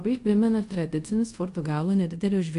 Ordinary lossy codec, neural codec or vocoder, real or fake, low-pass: AAC, 64 kbps; codec, 24 kHz, 0.5 kbps, DualCodec; fake; 10.8 kHz